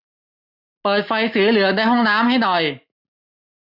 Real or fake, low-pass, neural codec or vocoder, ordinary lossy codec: real; 5.4 kHz; none; none